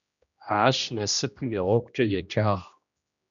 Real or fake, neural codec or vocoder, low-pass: fake; codec, 16 kHz, 1 kbps, X-Codec, HuBERT features, trained on general audio; 7.2 kHz